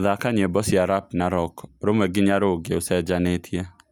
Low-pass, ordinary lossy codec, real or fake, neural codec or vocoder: none; none; real; none